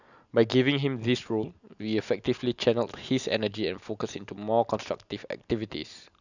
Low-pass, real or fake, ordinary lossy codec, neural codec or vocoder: 7.2 kHz; real; none; none